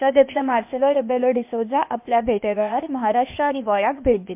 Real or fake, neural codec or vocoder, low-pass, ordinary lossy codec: fake; codec, 16 kHz, 0.8 kbps, ZipCodec; 3.6 kHz; MP3, 32 kbps